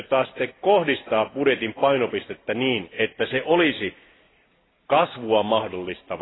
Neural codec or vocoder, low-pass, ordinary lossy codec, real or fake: none; 7.2 kHz; AAC, 16 kbps; real